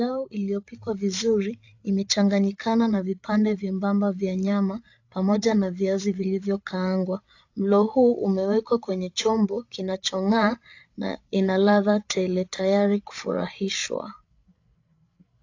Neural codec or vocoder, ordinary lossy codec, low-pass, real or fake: codec, 16 kHz, 8 kbps, FreqCodec, larger model; AAC, 48 kbps; 7.2 kHz; fake